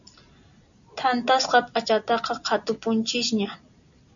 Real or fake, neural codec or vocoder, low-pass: real; none; 7.2 kHz